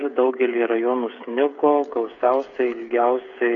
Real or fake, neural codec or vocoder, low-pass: fake; codec, 16 kHz, 8 kbps, FreqCodec, smaller model; 7.2 kHz